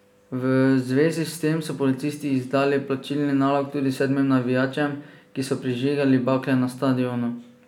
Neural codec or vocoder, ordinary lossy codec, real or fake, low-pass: none; none; real; 19.8 kHz